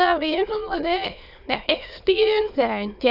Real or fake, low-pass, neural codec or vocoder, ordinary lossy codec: fake; 5.4 kHz; autoencoder, 22.05 kHz, a latent of 192 numbers a frame, VITS, trained on many speakers; none